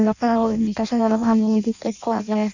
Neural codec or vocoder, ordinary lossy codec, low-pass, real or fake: codec, 16 kHz in and 24 kHz out, 0.6 kbps, FireRedTTS-2 codec; none; 7.2 kHz; fake